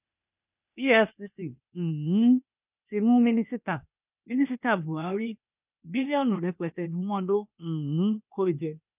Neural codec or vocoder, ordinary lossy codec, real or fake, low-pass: codec, 16 kHz, 0.8 kbps, ZipCodec; none; fake; 3.6 kHz